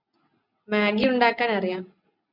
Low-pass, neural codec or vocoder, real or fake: 5.4 kHz; none; real